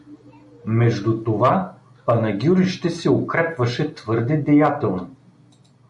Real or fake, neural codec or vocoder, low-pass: real; none; 10.8 kHz